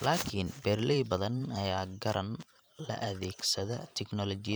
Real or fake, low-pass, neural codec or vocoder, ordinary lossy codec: fake; none; vocoder, 44.1 kHz, 128 mel bands every 512 samples, BigVGAN v2; none